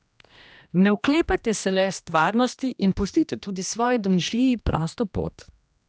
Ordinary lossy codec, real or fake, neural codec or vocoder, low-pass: none; fake; codec, 16 kHz, 1 kbps, X-Codec, HuBERT features, trained on general audio; none